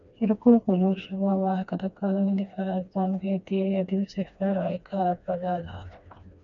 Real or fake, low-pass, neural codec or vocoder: fake; 7.2 kHz; codec, 16 kHz, 2 kbps, FreqCodec, smaller model